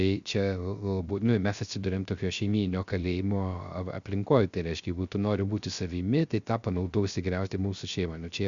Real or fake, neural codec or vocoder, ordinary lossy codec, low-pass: fake; codec, 16 kHz, 0.3 kbps, FocalCodec; Opus, 64 kbps; 7.2 kHz